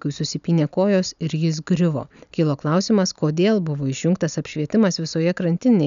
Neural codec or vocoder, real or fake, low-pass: none; real; 7.2 kHz